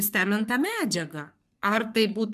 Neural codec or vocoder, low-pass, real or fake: codec, 44.1 kHz, 3.4 kbps, Pupu-Codec; 14.4 kHz; fake